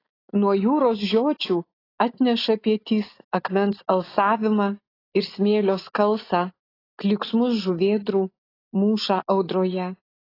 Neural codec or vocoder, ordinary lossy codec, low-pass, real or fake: none; AAC, 24 kbps; 5.4 kHz; real